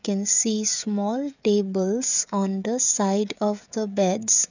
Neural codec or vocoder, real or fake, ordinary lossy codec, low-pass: codec, 16 kHz, 16 kbps, FreqCodec, smaller model; fake; none; 7.2 kHz